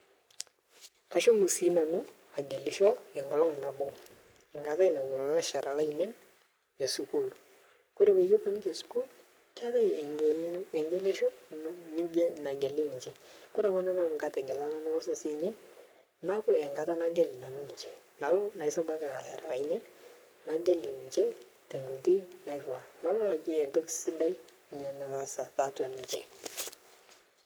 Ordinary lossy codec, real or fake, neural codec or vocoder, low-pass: none; fake; codec, 44.1 kHz, 3.4 kbps, Pupu-Codec; none